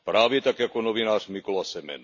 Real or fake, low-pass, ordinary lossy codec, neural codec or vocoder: real; 7.2 kHz; none; none